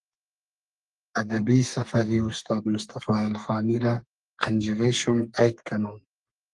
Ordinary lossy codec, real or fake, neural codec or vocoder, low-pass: Opus, 24 kbps; fake; codec, 32 kHz, 1.9 kbps, SNAC; 10.8 kHz